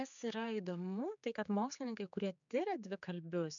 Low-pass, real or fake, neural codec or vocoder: 7.2 kHz; fake; codec, 16 kHz, 4 kbps, X-Codec, HuBERT features, trained on general audio